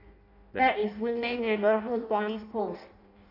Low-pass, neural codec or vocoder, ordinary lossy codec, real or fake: 5.4 kHz; codec, 16 kHz in and 24 kHz out, 0.6 kbps, FireRedTTS-2 codec; none; fake